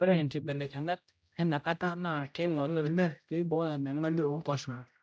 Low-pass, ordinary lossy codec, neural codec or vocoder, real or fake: none; none; codec, 16 kHz, 0.5 kbps, X-Codec, HuBERT features, trained on general audio; fake